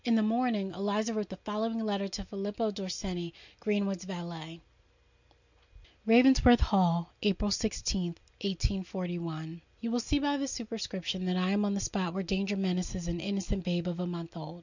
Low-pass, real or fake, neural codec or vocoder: 7.2 kHz; real; none